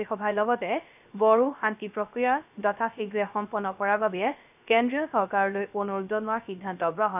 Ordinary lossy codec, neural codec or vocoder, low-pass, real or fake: none; codec, 16 kHz, 0.3 kbps, FocalCodec; 3.6 kHz; fake